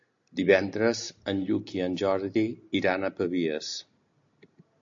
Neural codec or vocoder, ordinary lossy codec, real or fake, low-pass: none; AAC, 64 kbps; real; 7.2 kHz